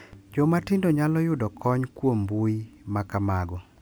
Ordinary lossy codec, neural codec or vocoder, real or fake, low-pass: none; none; real; none